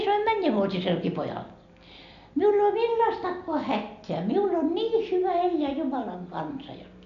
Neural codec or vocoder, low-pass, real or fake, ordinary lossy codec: none; 7.2 kHz; real; none